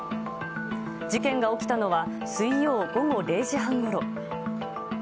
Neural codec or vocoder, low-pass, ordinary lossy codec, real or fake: none; none; none; real